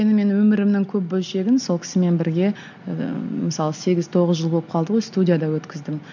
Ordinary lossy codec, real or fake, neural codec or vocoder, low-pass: none; real; none; 7.2 kHz